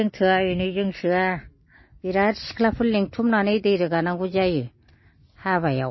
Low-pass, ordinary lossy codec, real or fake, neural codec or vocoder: 7.2 kHz; MP3, 24 kbps; real; none